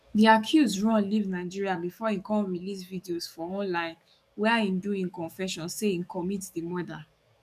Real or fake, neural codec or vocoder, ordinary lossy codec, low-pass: fake; codec, 44.1 kHz, 7.8 kbps, DAC; none; 14.4 kHz